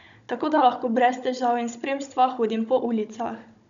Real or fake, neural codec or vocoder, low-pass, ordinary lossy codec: fake; codec, 16 kHz, 16 kbps, FunCodec, trained on Chinese and English, 50 frames a second; 7.2 kHz; none